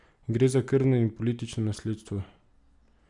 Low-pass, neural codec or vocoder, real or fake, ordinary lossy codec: 10.8 kHz; none; real; none